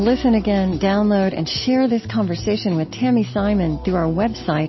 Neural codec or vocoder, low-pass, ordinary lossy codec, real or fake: none; 7.2 kHz; MP3, 24 kbps; real